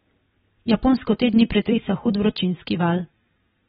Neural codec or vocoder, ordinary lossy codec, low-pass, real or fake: vocoder, 44.1 kHz, 128 mel bands every 256 samples, BigVGAN v2; AAC, 16 kbps; 19.8 kHz; fake